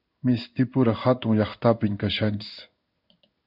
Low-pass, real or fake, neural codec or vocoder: 5.4 kHz; fake; codec, 16 kHz in and 24 kHz out, 1 kbps, XY-Tokenizer